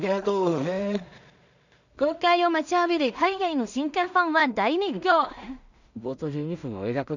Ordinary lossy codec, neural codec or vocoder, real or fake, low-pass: none; codec, 16 kHz in and 24 kHz out, 0.4 kbps, LongCat-Audio-Codec, two codebook decoder; fake; 7.2 kHz